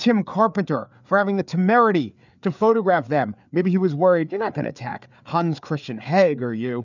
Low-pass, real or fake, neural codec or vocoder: 7.2 kHz; fake; codec, 16 kHz, 4 kbps, FreqCodec, larger model